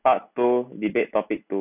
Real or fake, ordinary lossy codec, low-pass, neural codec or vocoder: real; none; 3.6 kHz; none